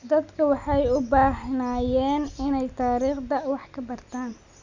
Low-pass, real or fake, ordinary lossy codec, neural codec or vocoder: 7.2 kHz; real; none; none